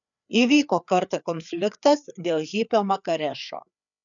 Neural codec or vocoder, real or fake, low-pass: codec, 16 kHz, 4 kbps, FreqCodec, larger model; fake; 7.2 kHz